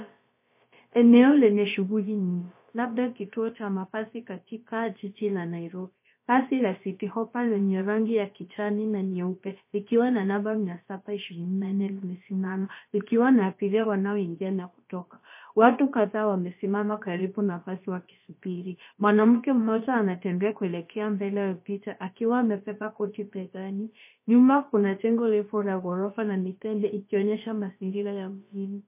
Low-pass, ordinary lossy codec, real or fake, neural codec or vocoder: 3.6 kHz; MP3, 24 kbps; fake; codec, 16 kHz, about 1 kbps, DyCAST, with the encoder's durations